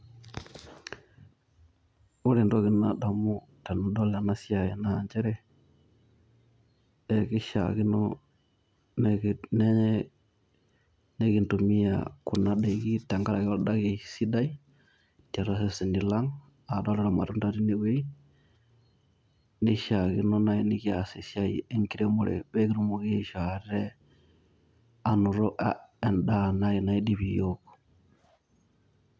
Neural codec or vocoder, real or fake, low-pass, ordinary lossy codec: none; real; none; none